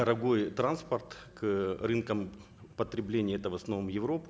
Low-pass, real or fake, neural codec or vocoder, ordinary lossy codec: none; real; none; none